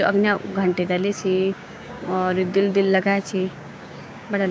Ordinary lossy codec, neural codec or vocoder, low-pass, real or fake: none; codec, 16 kHz, 6 kbps, DAC; none; fake